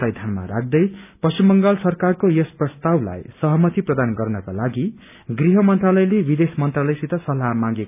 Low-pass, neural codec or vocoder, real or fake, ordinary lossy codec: 3.6 kHz; none; real; none